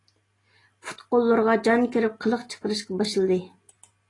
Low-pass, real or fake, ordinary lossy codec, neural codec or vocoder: 10.8 kHz; real; AAC, 32 kbps; none